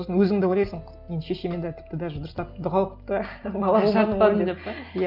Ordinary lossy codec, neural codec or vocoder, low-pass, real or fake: Opus, 24 kbps; none; 5.4 kHz; real